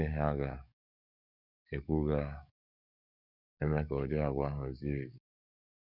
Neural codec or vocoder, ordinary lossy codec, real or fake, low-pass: codec, 44.1 kHz, 7.8 kbps, DAC; none; fake; 5.4 kHz